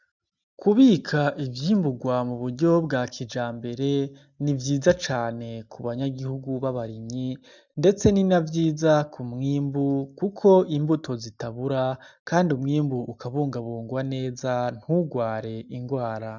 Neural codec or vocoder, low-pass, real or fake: none; 7.2 kHz; real